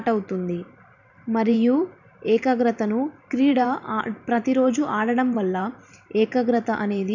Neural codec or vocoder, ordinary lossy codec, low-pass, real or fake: vocoder, 44.1 kHz, 128 mel bands every 512 samples, BigVGAN v2; none; 7.2 kHz; fake